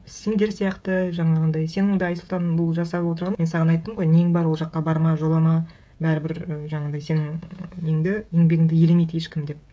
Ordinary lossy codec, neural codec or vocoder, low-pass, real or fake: none; codec, 16 kHz, 16 kbps, FreqCodec, smaller model; none; fake